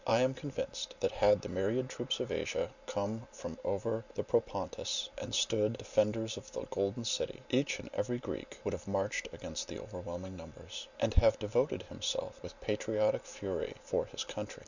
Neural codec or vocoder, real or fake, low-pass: none; real; 7.2 kHz